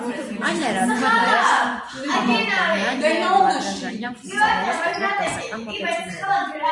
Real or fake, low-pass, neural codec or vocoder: fake; 10.8 kHz; vocoder, 44.1 kHz, 128 mel bands every 256 samples, BigVGAN v2